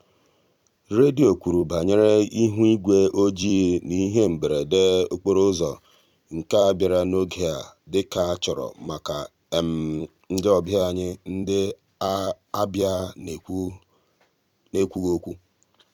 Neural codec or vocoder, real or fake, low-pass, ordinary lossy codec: vocoder, 44.1 kHz, 128 mel bands every 256 samples, BigVGAN v2; fake; 19.8 kHz; none